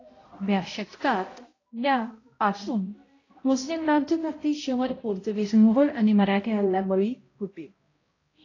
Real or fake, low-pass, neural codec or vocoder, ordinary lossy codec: fake; 7.2 kHz; codec, 16 kHz, 0.5 kbps, X-Codec, HuBERT features, trained on balanced general audio; AAC, 32 kbps